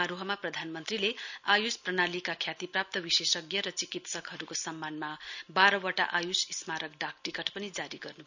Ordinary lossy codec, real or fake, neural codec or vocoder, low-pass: none; real; none; 7.2 kHz